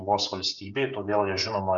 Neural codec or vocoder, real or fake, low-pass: codec, 16 kHz, 16 kbps, FreqCodec, smaller model; fake; 7.2 kHz